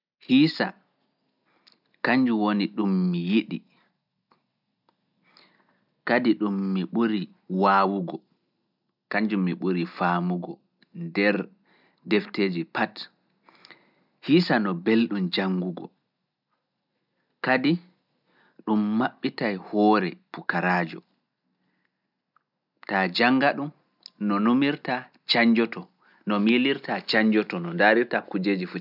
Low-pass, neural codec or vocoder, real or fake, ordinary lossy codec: 5.4 kHz; none; real; none